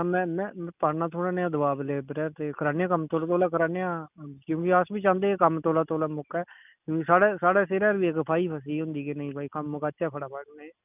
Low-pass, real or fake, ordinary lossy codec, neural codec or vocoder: 3.6 kHz; real; none; none